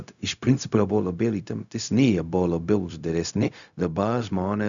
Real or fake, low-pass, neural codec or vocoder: fake; 7.2 kHz; codec, 16 kHz, 0.4 kbps, LongCat-Audio-Codec